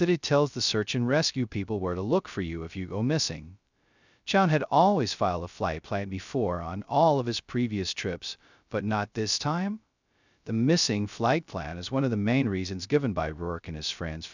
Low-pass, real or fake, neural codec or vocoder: 7.2 kHz; fake; codec, 16 kHz, 0.2 kbps, FocalCodec